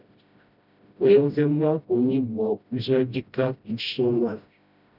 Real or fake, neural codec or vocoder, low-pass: fake; codec, 16 kHz, 0.5 kbps, FreqCodec, smaller model; 5.4 kHz